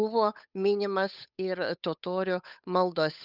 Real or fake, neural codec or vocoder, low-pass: fake; codec, 16 kHz, 8 kbps, FunCodec, trained on Chinese and English, 25 frames a second; 5.4 kHz